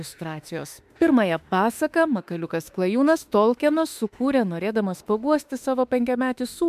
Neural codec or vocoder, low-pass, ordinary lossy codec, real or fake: autoencoder, 48 kHz, 32 numbers a frame, DAC-VAE, trained on Japanese speech; 14.4 kHz; MP3, 96 kbps; fake